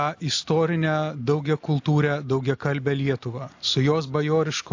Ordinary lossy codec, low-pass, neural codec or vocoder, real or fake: AAC, 48 kbps; 7.2 kHz; none; real